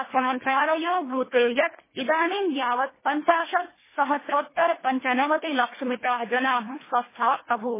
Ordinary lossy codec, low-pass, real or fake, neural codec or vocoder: MP3, 16 kbps; 3.6 kHz; fake; codec, 24 kHz, 1.5 kbps, HILCodec